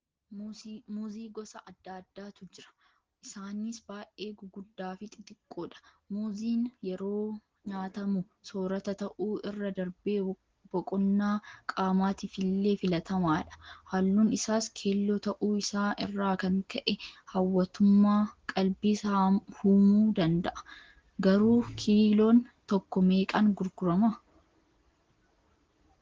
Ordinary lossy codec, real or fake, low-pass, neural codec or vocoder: Opus, 16 kbps; real; 7.2 kHz; none